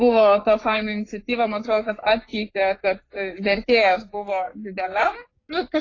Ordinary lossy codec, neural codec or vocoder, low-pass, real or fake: AAC, 32 kbps; codec, 44.1 kHz, 3.4 kbps, Pupu-Codec; 7.2 kHz; fake